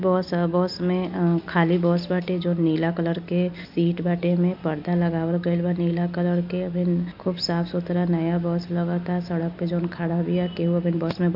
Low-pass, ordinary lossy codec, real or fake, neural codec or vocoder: 5.4 kHz; AAC, 48 kbps; real; none